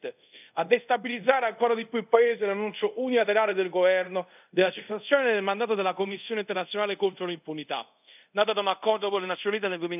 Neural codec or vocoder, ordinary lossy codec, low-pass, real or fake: codec, 24 kHz, 0.5 kbps, DualCodec; none; 3.6 kHz; fake